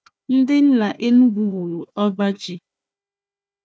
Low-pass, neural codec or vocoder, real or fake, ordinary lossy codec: none; codec, 16 kHz, 1 kbps, FunCodec, trained on Chinese and English, 50 frames a second; fake; none